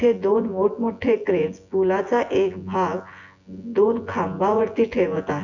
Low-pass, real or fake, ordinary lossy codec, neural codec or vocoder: 7.2 kHz; fake; none; vocoder, 24 kHz, 100 mel bands, Vocos